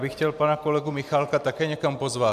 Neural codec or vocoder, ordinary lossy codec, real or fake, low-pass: none; MP3, 96 kbps; real; 14.4 kHz